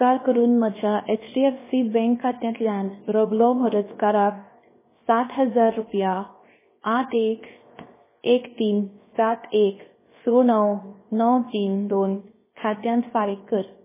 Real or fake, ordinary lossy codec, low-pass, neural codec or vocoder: fake; MP3, 16 kbps; 3.6 kHz; codec, 16 kHz, 0.7 kbps, FocalCodec